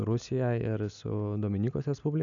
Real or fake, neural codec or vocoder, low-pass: real; none; 7.2 kHz